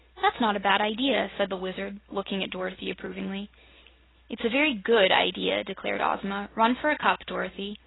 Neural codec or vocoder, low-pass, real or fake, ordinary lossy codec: none; 7.2 kHz; real; AAC, 16 kbps